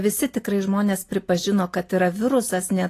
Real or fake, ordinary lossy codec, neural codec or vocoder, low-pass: real; AAC, 48 kbps; none; 14.4 kHz